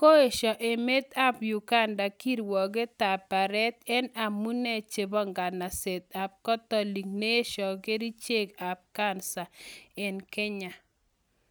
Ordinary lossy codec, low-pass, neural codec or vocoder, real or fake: none; none; none; real